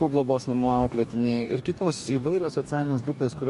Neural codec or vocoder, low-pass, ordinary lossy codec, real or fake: codec, 44.1 kHz, 2.6 kbps, DAC; 14.4 kHz; MP3, 48 kbps; fake